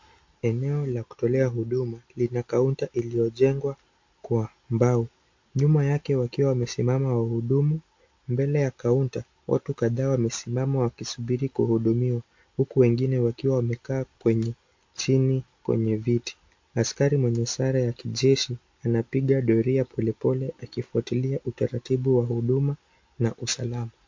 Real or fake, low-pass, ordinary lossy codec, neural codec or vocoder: real; 7.2 kHz; MP3, 48 kbps; none